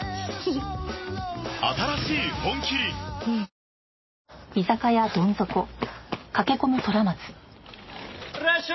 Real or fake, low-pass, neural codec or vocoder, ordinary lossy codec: real; 7.2 kHz; none; MP3, 24 kbps